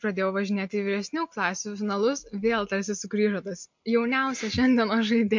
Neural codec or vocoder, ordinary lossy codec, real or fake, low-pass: none; MP3, 48 kbps; real; 7.2 kHz